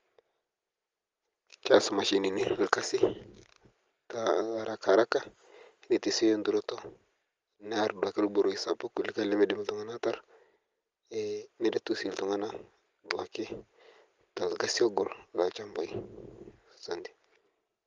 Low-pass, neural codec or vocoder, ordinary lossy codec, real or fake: 7.2 kHz; none; Opus, 32 kbps; real